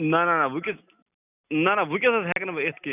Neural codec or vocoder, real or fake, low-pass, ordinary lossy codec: none; real; 3.6 kHz; none